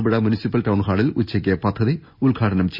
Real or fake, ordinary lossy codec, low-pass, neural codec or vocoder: real; none; 5.4 kHz; none